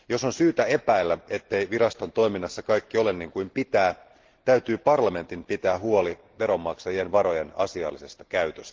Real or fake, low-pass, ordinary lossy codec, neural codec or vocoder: real; 7.2 kHz; Opus, 16 kbps; none